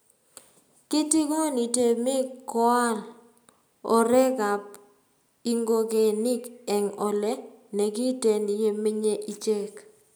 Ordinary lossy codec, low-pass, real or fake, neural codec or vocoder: none; none; real; none